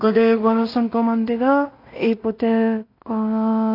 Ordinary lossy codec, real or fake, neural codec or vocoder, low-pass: AAC, 32 kbps; fake; codec, 16 kHz in and 24 kHz out, 0.4 kbps, LongCat-Audio-Codec, two codebook decoder; 5.4 kHz